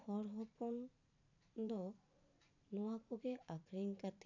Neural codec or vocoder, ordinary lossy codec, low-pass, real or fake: vocoder, 44.1 kHz, 128 mel bands every 512 samples, BigVGAN v2; none; 7.2 kHz; fake